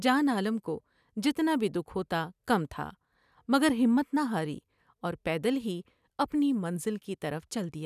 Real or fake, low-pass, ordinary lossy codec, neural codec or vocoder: real; 14.4 kHz; none; none